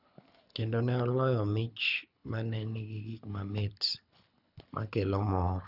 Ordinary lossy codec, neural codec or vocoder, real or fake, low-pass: none; codec, 24 kHz, 6 kbps, HILCodec; fake; 5.4 kHz